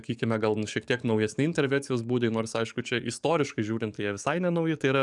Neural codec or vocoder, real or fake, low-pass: codec, 44.1 kHz, 7.8 kbps, DAC; fake; 10.8 kHz